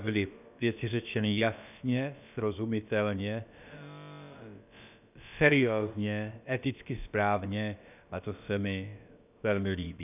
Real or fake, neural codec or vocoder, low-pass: fake; codec, 16 kHz, about 1 kbps, DyCAST, with the encoder's durations; 3.6 kHz